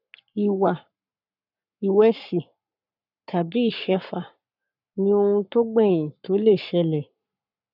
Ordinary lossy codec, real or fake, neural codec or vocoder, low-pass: none; fake; codec, 44.1 kHz, 7.8 kbps, Pupu-Codec; 5.4 kHz